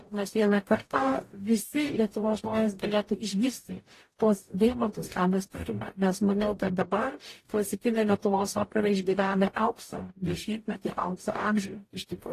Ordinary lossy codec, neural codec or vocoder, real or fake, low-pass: AAC, 48 kbps; codec, 44.1 kHz, 0.9 kbps, DAC; fake; 14.4 kHz